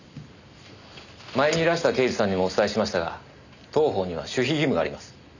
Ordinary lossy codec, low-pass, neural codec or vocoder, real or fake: none; 7.2 kHz; none; real